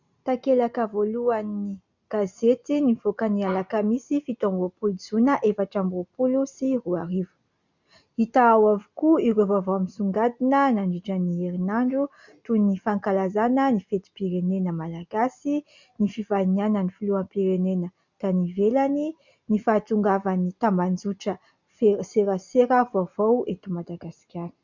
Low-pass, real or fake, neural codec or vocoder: 7.2 kHz; real; none